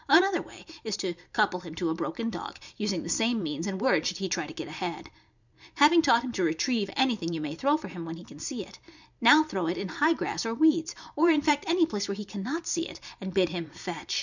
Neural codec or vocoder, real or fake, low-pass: vocoder, 44.1 kHz, 128 mel bands every 512 samples, BigVGAN v2; fake; 7.2 kHz